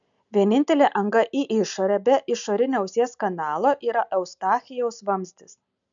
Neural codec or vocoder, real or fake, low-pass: none; real; 7.2 kHz